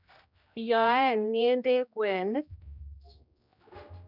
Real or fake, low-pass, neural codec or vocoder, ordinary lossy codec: fake; 5.4 kHz; codec, 16 kHz, 1 kbps, X-Codec, HuBERT features, trained on general audio; none